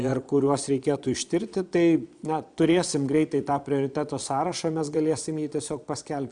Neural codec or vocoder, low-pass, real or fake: vocoder, 22.05 kHz, 80 mel bands, Vocos; 9.9 kHz; fake